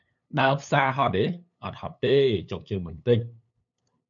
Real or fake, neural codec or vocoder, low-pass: fake; codec, 16 kHz, 8 kbps, FunCodec, trained on LibriTTS, 25 frames a second; 7.2 kHz